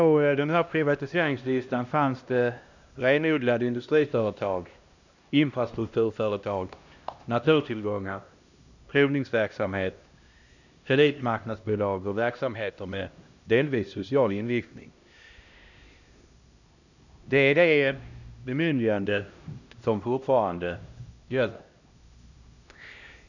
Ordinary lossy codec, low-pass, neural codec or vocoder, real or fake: none; 7.2 kHz; codec, 16 kHz, 1 kbps, X-Codec, HuBERT features, trained on LibriSpeech; fake